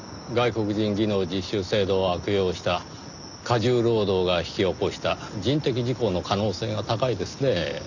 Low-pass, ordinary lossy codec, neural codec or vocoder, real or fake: 7.2 kHz; none; none; real